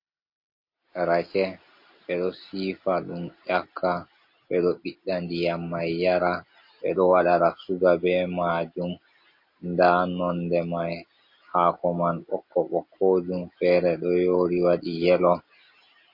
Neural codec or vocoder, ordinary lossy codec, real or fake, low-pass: none; MP3, 32 kbps; real; 5.4 kHz